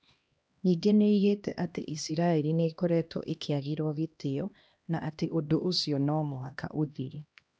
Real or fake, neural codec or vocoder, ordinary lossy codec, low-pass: fake; codec, 16 kHz, 1 kbps, X-Codec, HuBERT features, trained on LibriSpeech; none; none